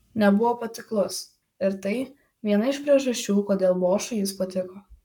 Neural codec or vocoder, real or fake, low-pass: codec, 44.1 kHz, 7.8 kbps, Pupu-Codec; fake; 19.8 kHz